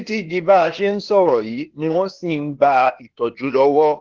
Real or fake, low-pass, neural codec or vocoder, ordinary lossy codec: fake; 7.2 kHz; codec, 16 kHz, 0.8 kbps, ZipCodec; Opus, 16 kbps